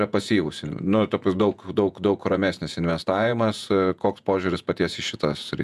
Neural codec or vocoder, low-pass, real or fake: vocoder, 48 kHz, 128 mel bands, Vocos; 14.4 kHz; fake